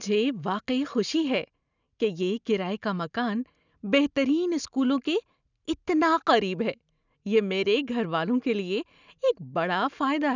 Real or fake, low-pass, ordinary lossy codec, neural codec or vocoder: real; 7.2 kHz; none; none